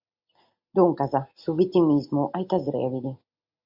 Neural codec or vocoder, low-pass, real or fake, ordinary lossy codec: none; 5.4 kHz; real; MP3, 48 kbps